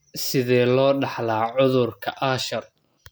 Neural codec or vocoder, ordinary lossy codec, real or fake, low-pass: none; none; real; none